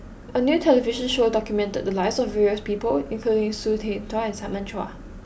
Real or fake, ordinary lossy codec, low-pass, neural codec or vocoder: real; none; none; none